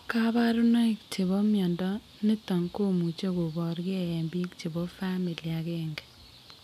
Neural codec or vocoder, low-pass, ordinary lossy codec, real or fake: none; 14.4 kHz; none; real